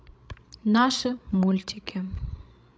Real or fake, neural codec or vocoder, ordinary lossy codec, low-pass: fake; codec, 16 kHz, 16 kbps, FreqCodec, larger model; none; none